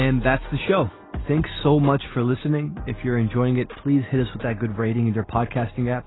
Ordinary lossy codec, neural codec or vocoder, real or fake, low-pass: AAC, 16 kbps; none; real; 7.2 kHz